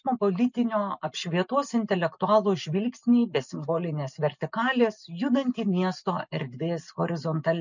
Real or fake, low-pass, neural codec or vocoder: real; 7.2 kHz; none